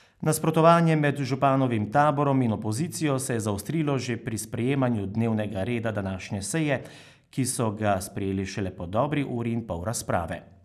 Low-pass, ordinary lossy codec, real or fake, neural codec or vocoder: 14.4 kHz; none; real; none